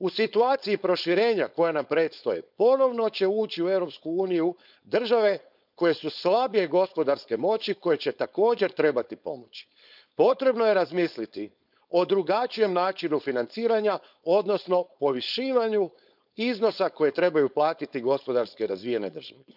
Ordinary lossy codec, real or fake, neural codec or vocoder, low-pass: none; fake; codec, 16 kHz, 4.8 kbps, FACodec; 5.4 kHz